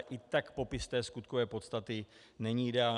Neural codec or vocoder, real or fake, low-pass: none; real; 10.8 kHz